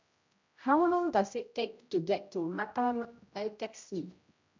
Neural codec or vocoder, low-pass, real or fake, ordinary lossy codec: codec, 16 kHz, 0.5 kbps, X-Codec, HuBERT features, trained on general audio; 7.2 kHz; fake; MP3, 64 kbps